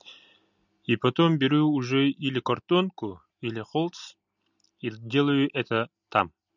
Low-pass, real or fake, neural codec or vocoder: 7.2 kHz; real; none